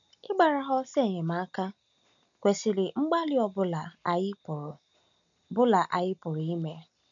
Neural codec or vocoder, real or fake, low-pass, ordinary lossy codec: none; real; 7.2 kHz; none